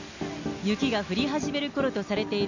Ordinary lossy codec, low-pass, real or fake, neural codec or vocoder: none; 7.2 kHz; real; none